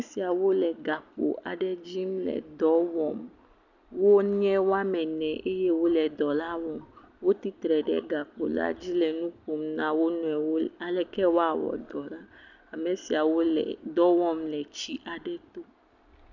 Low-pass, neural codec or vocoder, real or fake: 7.2 kHz; none; real